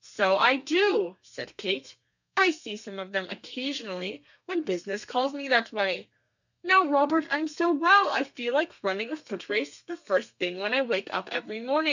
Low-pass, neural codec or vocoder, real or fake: 7.2 kHz; codec, 32 kHz, 1.9 kbps, SNAC; fake